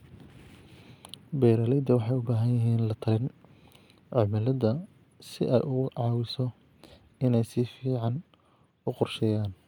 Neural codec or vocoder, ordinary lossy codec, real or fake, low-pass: none; none; real; 19.8 kHz